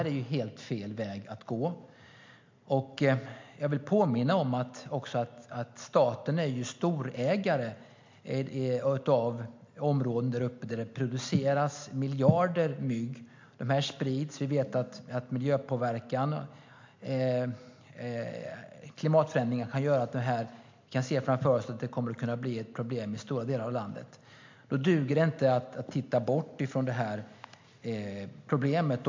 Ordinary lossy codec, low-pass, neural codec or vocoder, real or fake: MP3, 48 kbps; 7.2 kHz; none; real